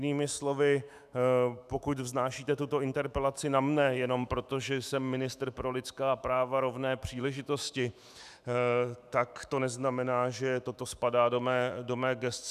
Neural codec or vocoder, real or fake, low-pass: autoencoder, 48 kHz, 128 numbers a frame, DAC-VAE, trained on Japanese speech; fake; 14.4 kHz